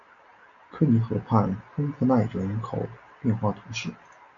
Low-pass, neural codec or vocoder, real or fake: 7.2 kHz; none; real